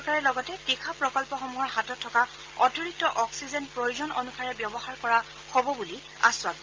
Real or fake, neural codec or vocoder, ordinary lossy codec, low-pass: real; none; Opus, 24 kbps; 7.2 kHz